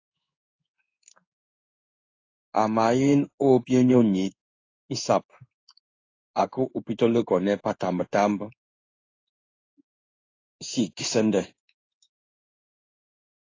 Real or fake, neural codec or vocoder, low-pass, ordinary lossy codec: fake; codec, 16 kHz in and 24 kHz out, 1 kbps, XY-Tokenizer; 7.2 kHz; AAC, 32 kbps